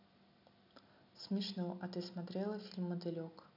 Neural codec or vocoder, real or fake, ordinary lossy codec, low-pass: none; real; none; 5.4 kHz